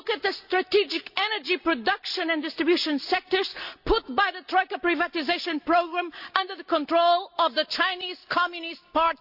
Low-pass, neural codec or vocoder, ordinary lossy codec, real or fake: 5.4 kHz; none; none; real